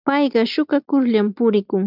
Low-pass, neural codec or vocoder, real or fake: 5.4 kHz; none; real